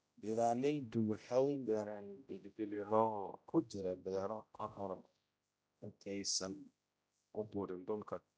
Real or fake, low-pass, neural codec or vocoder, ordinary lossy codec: fake; none; codec, 16 kHz, 0.5 kbps, X-Codec, HuBERT features, trained on general audio; none